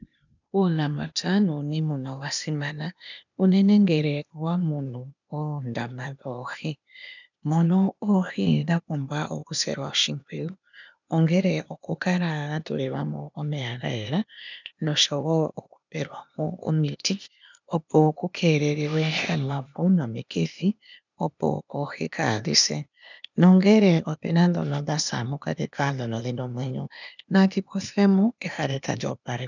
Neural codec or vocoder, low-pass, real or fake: codec, 16 kHz, 0.8 kbps, ZipCodec; 7.2 kHz; fake